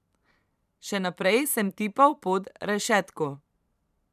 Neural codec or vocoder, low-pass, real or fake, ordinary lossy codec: vocoder, 44.1 kHz, 128 mel bands every 512 samples, BigVGAN v2; 14.4 kHz; fake; none